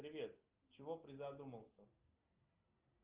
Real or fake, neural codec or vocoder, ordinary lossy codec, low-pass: real; none; Opus, 32 kbps; 3.6 kHz